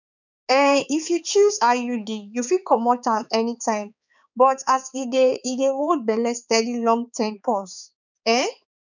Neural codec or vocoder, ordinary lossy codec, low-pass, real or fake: codec, 16 kHz, 4 kbps, X-Codec, HuBERT features, trained on balanced general audio; none; 7.2 kHz; fake